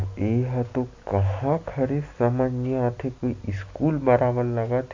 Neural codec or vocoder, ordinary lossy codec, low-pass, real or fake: none; MP3, 48 kbps; 7.2 kHz; real